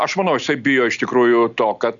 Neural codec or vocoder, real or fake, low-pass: none; real; 7.2 kHz